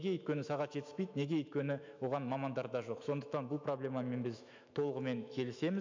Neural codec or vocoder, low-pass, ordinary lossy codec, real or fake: autoencoder, 48 kHz, 128 numbers a frame, DAC-VAE, trained on Japanese speech; 7.2 kHz; MP3, 64 kbps; fake